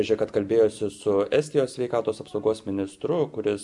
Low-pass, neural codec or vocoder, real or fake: 10.8 kHz; none; real